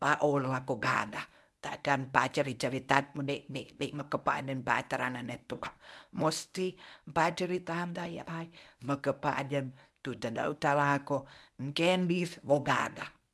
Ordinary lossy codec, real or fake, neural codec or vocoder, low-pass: none; fake; codec, 24 kHz, 0.9 kbps, WavTokenizer, medium speech release version 1; none